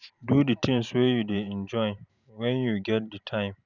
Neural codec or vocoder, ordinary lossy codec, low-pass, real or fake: vocoder, 44.1 kHz, 128 mel bands every 512 samples, BigVGAN v2; none; 7.2 kHz; fake